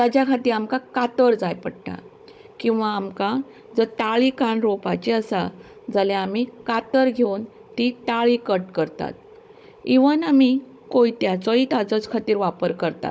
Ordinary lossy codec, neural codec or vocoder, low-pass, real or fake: none; codec, 16 kHz, 16 kbps, FunCodec, trained on Chinese and English, 50 frames a second; none; fake